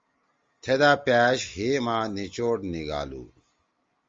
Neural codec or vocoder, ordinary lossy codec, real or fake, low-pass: none; Opus, 32 kbps; real; 7.2 kHz